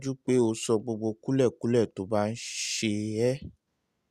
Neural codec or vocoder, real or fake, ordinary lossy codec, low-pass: none; real; Opus, 64 kbps; 14.4 kHz